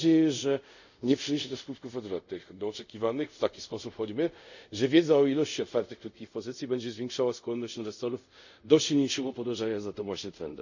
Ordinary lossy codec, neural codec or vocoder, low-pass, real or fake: none; codec, 24 kHz, 0.5 kbps, DualCodec; 7.2 kHz; fake